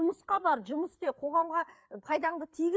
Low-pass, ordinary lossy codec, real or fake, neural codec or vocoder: none; none; fake; codec, 16 kHz, 4 kbps, FreqCodec, larger model